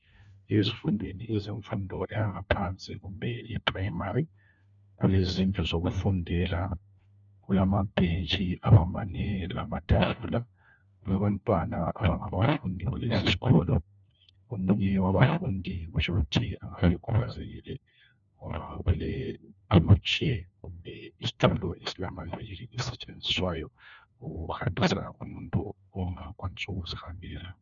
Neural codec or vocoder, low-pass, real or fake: codec, 16 kHz, 1 kbps, FunCodec, trained on LibriTTS, 50 frames a second; 7.2 kHz; fake